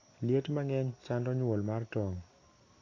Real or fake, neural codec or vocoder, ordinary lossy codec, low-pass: real; none; AAC, 32 kbps; 7.2 kHz